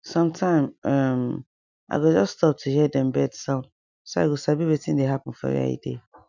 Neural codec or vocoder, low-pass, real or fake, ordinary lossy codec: none; 7.2 kHz; real; none